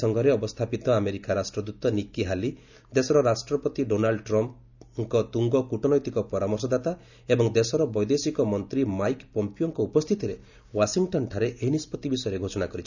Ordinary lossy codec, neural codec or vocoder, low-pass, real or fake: none; none; 7.2 kHz; real